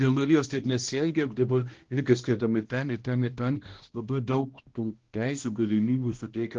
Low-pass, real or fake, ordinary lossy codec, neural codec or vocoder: 7.2 kHz; fake; Opus, 16 kbps; codec, 16 kHz, 1 kbps, X-Codec, HuBERT features, trained on balanced general audio